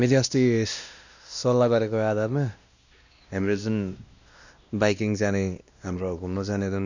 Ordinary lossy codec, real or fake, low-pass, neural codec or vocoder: none; fake; 7.2 kHz; codec, 16 kHz, 1 kbps, X-Codec, WavLM features, trained on Multilingual LibriSpeech